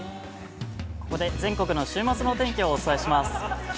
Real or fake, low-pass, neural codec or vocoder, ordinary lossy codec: real; none; none; none